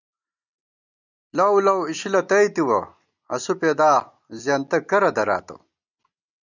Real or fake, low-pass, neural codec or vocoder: real; 7.2 kHz; none